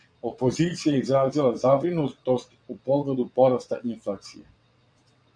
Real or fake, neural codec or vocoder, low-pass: fake; vocoder, 22.05 kHz, 80 mel bands, WaveNeXt; 9.9 kHz